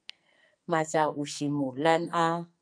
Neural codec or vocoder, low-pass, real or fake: codec, 44.1 kHz, 2.6 kbps, SNAC; 9.9 kHz; fake